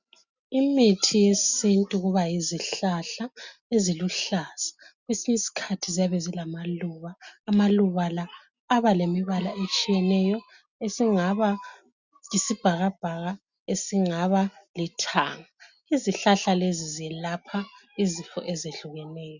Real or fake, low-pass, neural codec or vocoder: real; 7.2 kHz; none